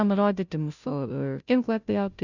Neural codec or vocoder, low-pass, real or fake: codec, 16 kHz, 0.5 kbps, FunCodec, trained on Chinese and English, 25 frames a second; 7.2 kHz; fake